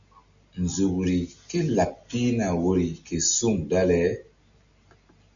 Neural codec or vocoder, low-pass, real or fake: none; 7.2 kHz; real